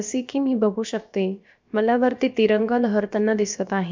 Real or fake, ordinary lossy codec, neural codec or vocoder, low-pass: fake; MP3, 64 kbps; codec, 16 kHz, about 1 kbps, DyCAST, with the encoder's durations; 7.2 kHz